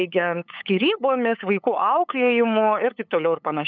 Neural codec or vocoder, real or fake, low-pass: codec, 16 kHz, 8 kbps, FunCodec, trained on LibriTTS, 25 frames a second; fake; 7.2 kHz